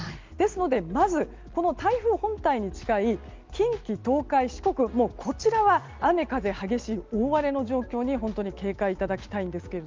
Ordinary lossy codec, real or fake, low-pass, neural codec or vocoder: Opus, 24 kbps; real; 7.2 kHz; none